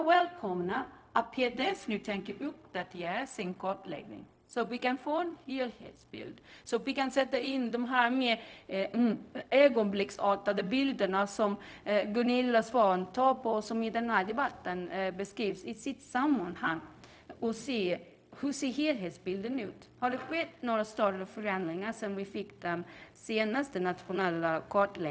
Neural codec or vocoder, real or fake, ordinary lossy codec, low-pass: codec, 16 kHz, 0.4 kbps, LongCat-Audio-Codec; fake; none; none